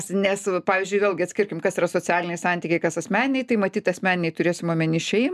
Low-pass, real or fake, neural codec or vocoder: 14.4 kHz; real; none